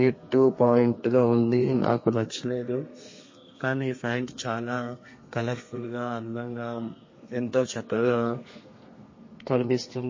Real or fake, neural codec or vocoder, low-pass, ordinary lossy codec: fake; codec, 32 kHz, 1.9 kbps, SNAC; 7.2 kHz; MP3, 32 kbps